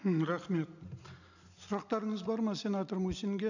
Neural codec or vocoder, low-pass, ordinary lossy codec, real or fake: none; 7.2 kHz; none; real